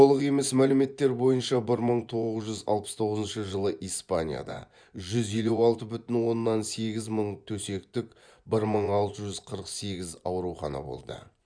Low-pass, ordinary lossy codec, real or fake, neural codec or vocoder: 9.9 kHz; none; fake; vocoder, 24 kHz, 100 mel bands, Vocos